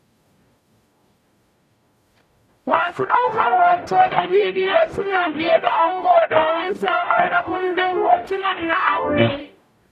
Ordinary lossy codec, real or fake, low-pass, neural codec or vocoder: none; fake; 14.4 kHz; codec, 44.1 kHz, 0.9 kbps, DAC